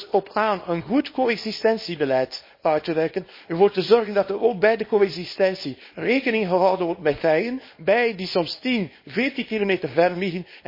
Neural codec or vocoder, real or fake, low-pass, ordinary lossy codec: codec, 24 kHz, 0.9 kbps, WavTokenizer, small release; fake; 5.4 kHz; MP3, 24 kbps